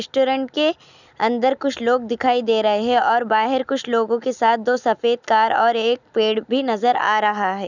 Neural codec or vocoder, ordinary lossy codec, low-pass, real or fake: none; none; 7.2 kHz; real